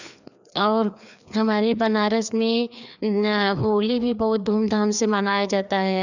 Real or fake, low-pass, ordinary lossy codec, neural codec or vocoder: fake; 7.2 kHz; none; codec, 16 kHz, 2 kbps, FreqCodec, larger model